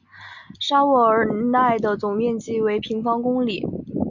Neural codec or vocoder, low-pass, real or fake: none; 7.2 kHz; real